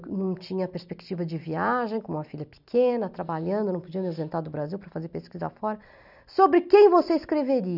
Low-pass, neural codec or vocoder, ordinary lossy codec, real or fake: 5.4 kHz; none; none; real